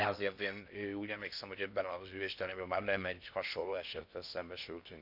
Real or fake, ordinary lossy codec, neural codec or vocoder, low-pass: fake; none; codec, 16 kHz in and 24 kHz out, 0.6 kbps, FocalCodec, streaming, 4096 codes; 5.4 kHz